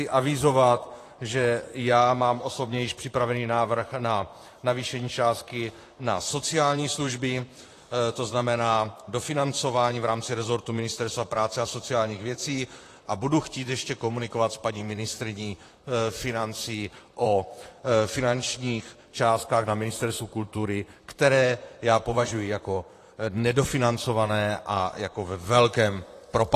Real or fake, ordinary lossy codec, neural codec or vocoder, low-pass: fake; AAC, 48 kbps; vocoder, 44.1 kHz, 128 mel bands, Pupu-Vocoder; 14.4 kHz